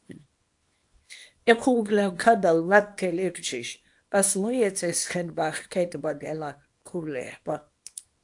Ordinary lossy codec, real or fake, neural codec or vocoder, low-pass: AAC, 64 kbps; fake; codec, 24 kHz, 0.9 kbps, WavTokenizer, small release; 10.8 kHz